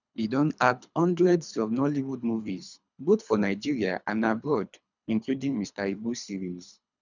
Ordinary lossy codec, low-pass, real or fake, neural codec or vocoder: none; 7.2 kHz; fake; codec, 24 kHz, 3 kbps, HILCodec